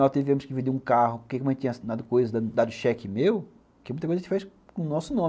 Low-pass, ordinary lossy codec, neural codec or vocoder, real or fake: none; none; none; real